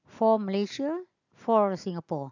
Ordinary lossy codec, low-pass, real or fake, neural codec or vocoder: none; 7.2 kHz; real; none